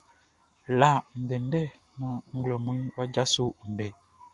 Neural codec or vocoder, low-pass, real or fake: codec, 44.1 kHz, 7.8 kbps, Pupu-Codec; 10.8 kHz; fake